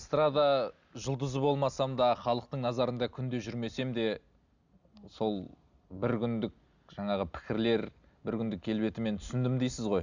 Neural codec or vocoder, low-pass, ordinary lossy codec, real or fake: none; 7.2 kHz; Opus, 64 kbps; real